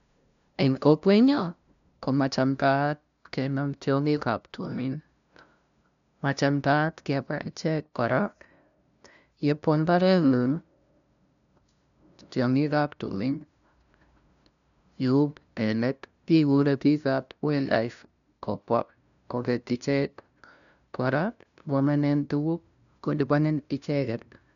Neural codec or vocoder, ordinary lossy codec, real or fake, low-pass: codec, 16 kHz, 0.5 kbps, FunCodec, trained on LibriTTS, 25 frames a second; MP3, 96 kbps; fake; 7.2 kHz